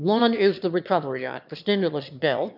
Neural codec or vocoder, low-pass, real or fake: autoencoder, 22.05 kHz, a latent of 192 numbers a frame, VITS, trained on one speaker; 5.4 kHz; fake